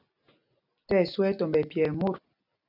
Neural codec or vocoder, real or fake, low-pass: none; real; 5.4 kHz